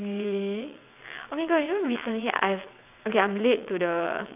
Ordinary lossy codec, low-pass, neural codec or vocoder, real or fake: AAC, 32 kbps; 3.6 kHz; vocoder, 22.05 kHz, 80 mel bands, WaveNeXt; fake